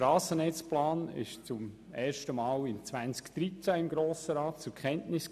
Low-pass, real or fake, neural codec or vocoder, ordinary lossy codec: 14.4 kHz; real; none; none